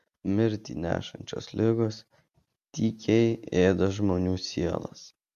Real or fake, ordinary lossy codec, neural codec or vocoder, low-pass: real; MP3, 64 kbps; none; 14.4 kHz